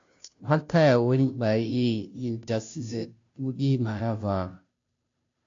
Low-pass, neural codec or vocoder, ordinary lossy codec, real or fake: 7.2 kHz; codec, 16 kHz, 0.5 kbps, FunCodec, trained on Chinese and English, 25 frames a second; AAC, 48 kbps; fake